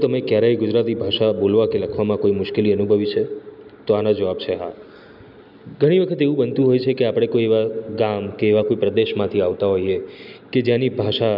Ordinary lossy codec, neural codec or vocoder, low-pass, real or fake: none; none; 5.4 kHz; real